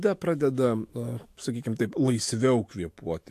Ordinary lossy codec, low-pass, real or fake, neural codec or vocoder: AAC, 64 kbps; 14.4 kHz; fake; autoencoder, 48 kHz, 128 numbers a frame, DAC-VAE, trained on Japanese speech